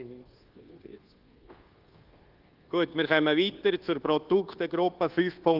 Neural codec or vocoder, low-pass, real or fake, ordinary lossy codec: codec, 24 kHz, 1.2 kbps, DualCodec; 5.4 kHz; fake; Opus, 16 kbps